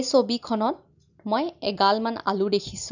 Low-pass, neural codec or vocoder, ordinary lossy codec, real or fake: 7.2 kHz; none; none; real